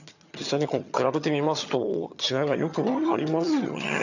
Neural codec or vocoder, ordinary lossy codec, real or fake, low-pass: vocoder, 22.05 kHz, 80 mel bands, HiFi-GAN; none; fake; 7.2 kHz